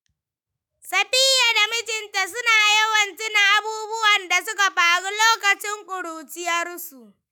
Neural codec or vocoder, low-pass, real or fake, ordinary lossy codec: autoencoder, 48 kHz, 128 numbers a frame, DAC-VAE, trained on Japanese speech; none; fake; none